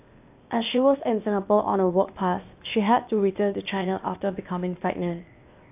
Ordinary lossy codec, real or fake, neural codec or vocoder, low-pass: AAC, 32 kbps; fake; codec, 16 kHz, 0.8 kbps, ZipCodec; 3.6 kHz